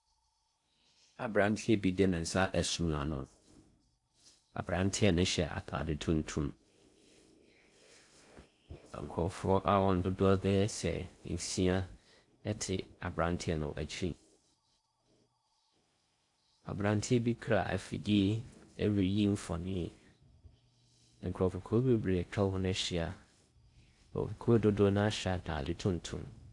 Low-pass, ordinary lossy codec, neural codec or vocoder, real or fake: 10.8 kHz; AAC, 64 kbps; codec, 16 kHz in and 24 kHz out, 0.6 kbps, FocalCodec, streaming, 4096 codes; fake